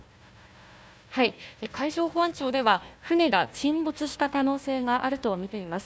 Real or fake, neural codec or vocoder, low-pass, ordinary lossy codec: fake; codec, 16 kHz, 1 kbps, FunCodec, trained on Chinese and English, 50 frames a second; none; none